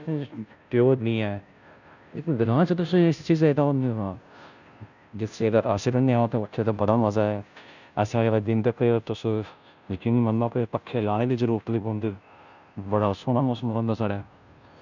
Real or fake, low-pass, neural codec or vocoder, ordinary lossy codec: fake; 7.2 kHz; codec, 16 kHz, 0.5 kbps, FunCodec, trained on Chinese and English, 25 frames a second; none